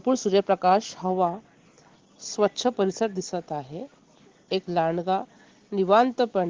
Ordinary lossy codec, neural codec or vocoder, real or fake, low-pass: Opus, 16 kbps; none; real; 7.2 kHz